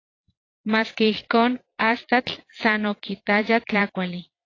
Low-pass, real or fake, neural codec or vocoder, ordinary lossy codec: 7.2 kHz; fake; vocoder, 22.05 kHz, 80 mel bands, Vocos; AAC, 32 kbps